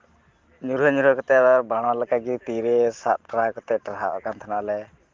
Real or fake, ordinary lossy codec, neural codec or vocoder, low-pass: real; Opus, 16 kbps; none; 7.2 kHz